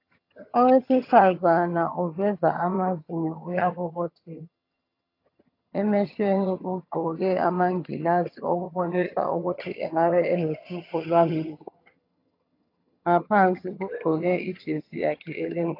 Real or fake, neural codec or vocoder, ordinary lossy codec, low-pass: fake; vocoder, 22.05 kHz, 80 mel bands, HiFi-GAN; AAC, 32 kbps; 5.4 kHz